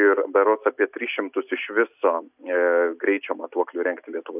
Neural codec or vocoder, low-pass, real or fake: none; 3.6 kHz; real